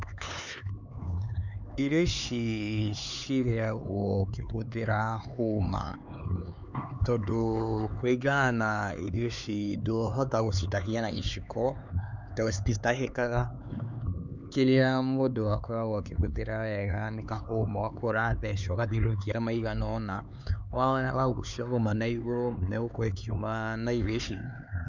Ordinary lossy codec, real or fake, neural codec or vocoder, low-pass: none; fake; codec, 16 kHz, 4 kbps, X-Codec, HuBERT features, trained on LibriSpeech; 7.2 kHz